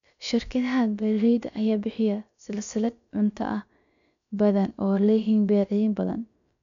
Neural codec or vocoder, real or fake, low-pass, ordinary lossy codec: codec, 16 kHz, about 1 kbps, DyCAST, with the encoder's durations; fake; 7.2 kHz; none